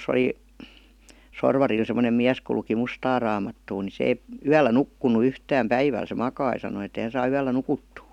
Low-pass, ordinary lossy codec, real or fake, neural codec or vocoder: 19.8 kHz; none; real; none